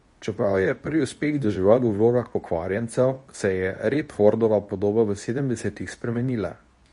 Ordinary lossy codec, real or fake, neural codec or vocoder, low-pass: MP3, 48 kbps; fake; codec, 24 kHz, 0.9 kbps, WavTokenizer, medium speech release version 2; 10.8 kHz